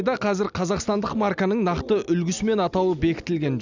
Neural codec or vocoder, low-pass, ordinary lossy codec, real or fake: none; 7.2 kHz; none; real